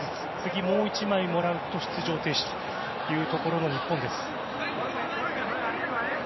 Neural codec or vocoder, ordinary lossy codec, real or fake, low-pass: none; MP3, 24 kbps; real; 7.2 kHz